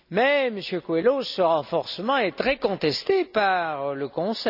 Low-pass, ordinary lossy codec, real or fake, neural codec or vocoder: 5.4 kHz; none; real; none